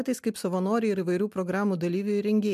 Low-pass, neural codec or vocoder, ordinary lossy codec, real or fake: 14.4 kHz; none; MP3, 96 kbps; real